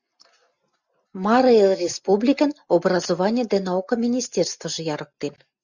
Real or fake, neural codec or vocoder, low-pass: real; none; 7.2 kHz